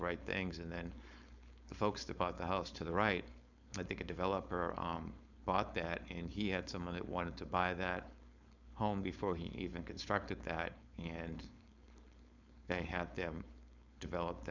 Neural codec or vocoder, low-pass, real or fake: codec, 16 kHz, 4.8 kbps, FACodec; 7.2 kHz; fake